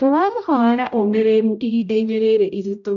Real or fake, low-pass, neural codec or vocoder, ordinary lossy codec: fake; 7.2 kHz; codec, 16 kHz, 0.5 kbps, X-Codec, HuBERT features, trained on general audio; none